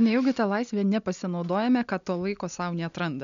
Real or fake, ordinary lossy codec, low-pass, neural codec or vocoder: real; MP3, 96 kbps; 7.2 kHz; none